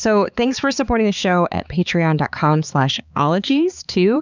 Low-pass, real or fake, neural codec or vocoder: 7.2 kHz; fake; codec, 16 kHz, 4 kbps, X-Codec, HuBERT features, trained on balanced general audio